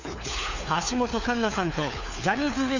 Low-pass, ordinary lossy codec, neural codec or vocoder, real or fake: 7.2 kHz; none; codec, 16 kHz, 4 kbps, FunCodec, trained on LibriTTS, 50 frames a second; fake